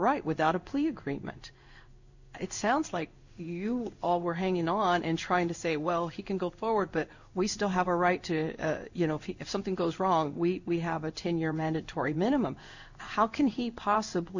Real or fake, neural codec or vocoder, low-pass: fake; codec, 16 kHz in and 24 kHz out, 1 kbps, XY-Tokenizer; 7.2 kHz